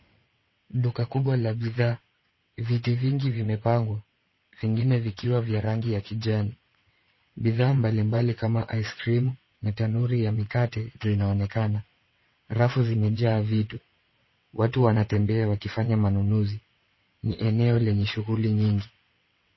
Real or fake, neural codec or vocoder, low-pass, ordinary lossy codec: fake; vocoder, 22.05 kHz, 80 mel bands, WaveNeXt; 7.2 kHz; MP3, 24 kbps